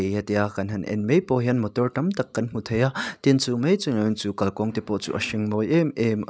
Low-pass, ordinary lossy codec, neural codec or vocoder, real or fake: none; none; none; real